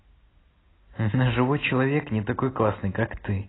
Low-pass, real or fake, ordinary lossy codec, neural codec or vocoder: 7.2 kHz; real; AAC, 16 kbps; none